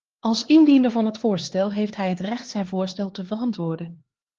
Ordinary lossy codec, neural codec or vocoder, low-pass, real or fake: Opus, 16 kbps; codec, 16 kHz, 2 kbps, X-Codec, HuBERT features, trained on LibriSpeech; 7.2 kHz; fake